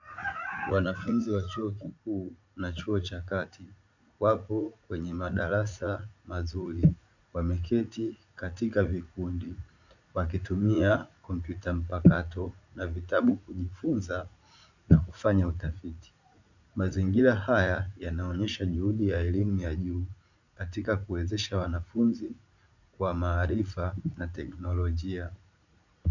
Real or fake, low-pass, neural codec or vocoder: fake; 7.2 kHz; vocoder, 44.1 kHz, 80 mel bands, Vocos